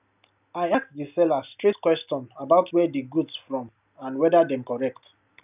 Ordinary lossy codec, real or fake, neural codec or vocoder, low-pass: none; real; none; 3.6 kHz